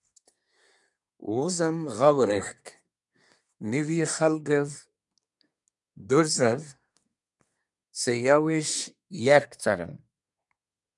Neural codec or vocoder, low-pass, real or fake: codec, 24 kHz, 1 kbps, SNAC; 10.8 kHz; fake